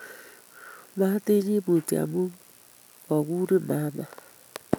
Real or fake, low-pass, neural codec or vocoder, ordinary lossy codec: real; none; none; none